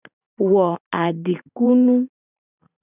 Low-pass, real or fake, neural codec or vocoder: 3.6 kHz; fake; vocoder, 44.1 kHz, 128 mel bands every 512 samples, BigVGAN v2